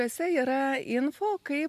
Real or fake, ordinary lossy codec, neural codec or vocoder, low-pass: real; AAC, 96 kbps; none; 14.4 kHz